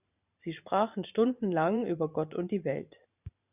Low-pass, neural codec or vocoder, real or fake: 3.6 kHz; vocoder, 44.1 kHz, 128 mel bands every 512 samples, BigVGAN v2; fake